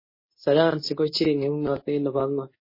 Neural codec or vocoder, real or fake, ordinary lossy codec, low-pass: codec, 24 kHz, 0.9 kbps, WavTokenizer, medium speech release version 2; fake; MP3, 24 kbps; 5.4 kHz